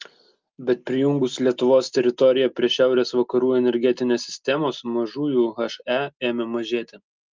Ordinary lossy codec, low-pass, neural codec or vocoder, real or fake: Opus, 24 kbps; 7.2 kHz; none; real